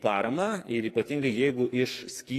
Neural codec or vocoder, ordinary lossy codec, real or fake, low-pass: codec, 44.1 kHz, 2.6 kbps, SNAC; AAC, 48 kbps; fake; 14.4 kHz